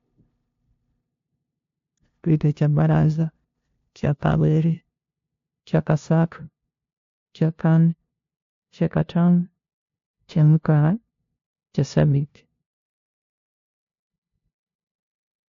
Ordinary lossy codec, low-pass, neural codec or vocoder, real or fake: AAC, 48 kbps; 7.2 kHz; codec, 16 kHz, 0.5 kbps, FunCodec, trained on LibriTTS, 25 frames a second; fake